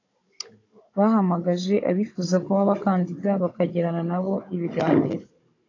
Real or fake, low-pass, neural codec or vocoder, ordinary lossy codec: fake; 7.2 kHz; codec, 16 kHz, 16 kbps, FunCodec, trained on Chinese and English, 50 frames a second; AAC, 32 kbps